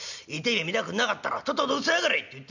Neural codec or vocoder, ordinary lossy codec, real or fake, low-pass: none; none; real; 7.2 kHz